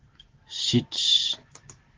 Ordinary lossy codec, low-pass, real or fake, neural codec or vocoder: Opus, 16 kbps; 7.2 kHz; fake; autoencoder, 48 kHz, 128 numbers a frame, DAC-VAE, trained on Japanese speech